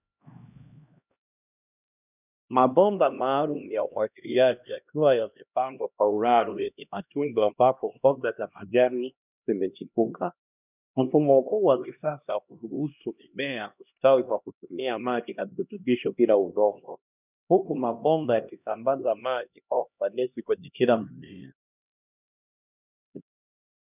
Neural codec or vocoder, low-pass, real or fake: codec, 16 kHz, 1 kbps, X-Codec, HuBERT features, trained on LibriSpeech; 3.6 kHz; fake